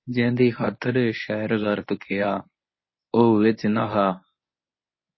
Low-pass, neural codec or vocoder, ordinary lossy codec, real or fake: 7.2 kHz; codec, 24 kHz, 0.9 kbps, WavTokenizer, medium speech release version 1; MP3, 24 kbps; fake